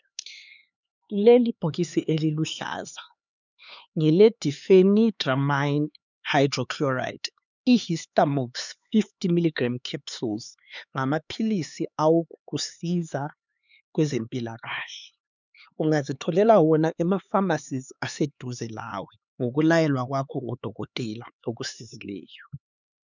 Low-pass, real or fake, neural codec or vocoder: 7.2 kHz; fake; codec, 16 kHz, 4 kbps, X-Codec, HuBERT features, trained on LibriSpeech